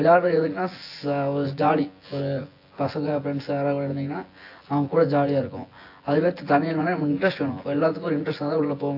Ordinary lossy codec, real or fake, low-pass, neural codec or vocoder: AAC, 32 kbps; fake; 5.4 kHz; vocoder, 24 kHz, 100 mel bands, Vocos